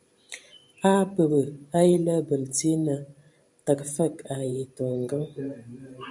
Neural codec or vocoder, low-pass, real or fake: vocoder, 44.1 kHz, 128 mel bands every 512 samples, BigVGAN v2; 10.8 kHz; fake